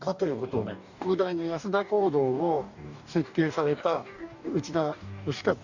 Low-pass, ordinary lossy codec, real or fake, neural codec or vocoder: 7.2 kHz; none; fake; codec, 44.1 kHz, 2.6 kbps, DAC